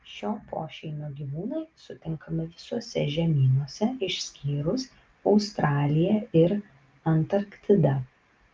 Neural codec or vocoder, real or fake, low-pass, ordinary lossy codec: none; real; 7.2 kHz; Opus, 24 kbps